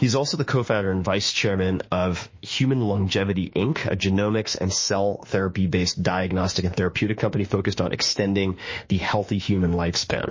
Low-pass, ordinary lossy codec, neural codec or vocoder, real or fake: 7.2 kHz; MP3, 32 kbps; autoencoder, 48 kHz, 32 numbers a frame, DAC-VAE, trained on Japanese speech; fake